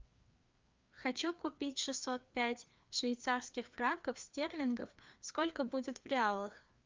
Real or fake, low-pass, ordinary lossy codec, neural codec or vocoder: fake; 7.2 kHz; Opus, 24 kbps; codec, 16 kHz, 2 kbps, FreqCodec, larger model